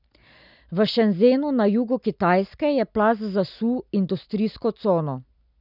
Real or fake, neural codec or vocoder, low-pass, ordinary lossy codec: real; none; 5.4 kHz; none